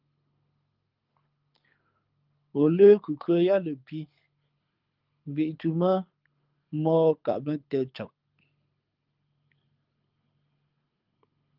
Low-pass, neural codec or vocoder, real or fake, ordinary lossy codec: 5.4 kHz; codec, 24 kHz, 6 kbps, HILCodec; fake; Opus, 32 kbps